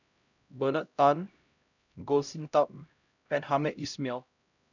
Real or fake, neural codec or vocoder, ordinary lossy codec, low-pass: fake; codec, 16 kHz, 0.5 kbps, X-Codec, HuBERT features, trained on LibriSpeech; none; 7.2 kHz